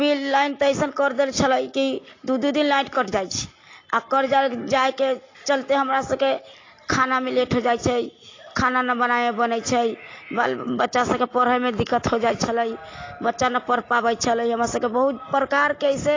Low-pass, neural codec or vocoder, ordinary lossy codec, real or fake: 7.2 kHz; none; AAC, 32 kbps; real